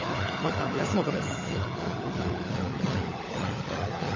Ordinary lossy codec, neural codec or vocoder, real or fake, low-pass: MP3, 32 kbps; codec, 16 kHz, 16 kbps, FunCodec, trained on LibriTTS, 50 frames a second; fake; 7.2 kHz